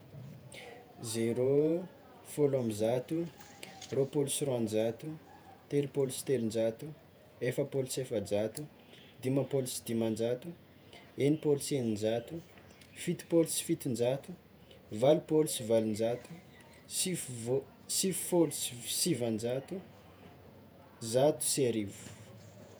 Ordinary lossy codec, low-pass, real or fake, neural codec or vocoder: none; none; fake; vocoder, 48 kHz, 128 mel bands, Vocos